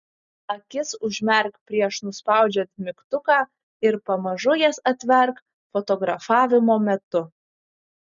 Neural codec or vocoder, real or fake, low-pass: none; real; 7.2 kHz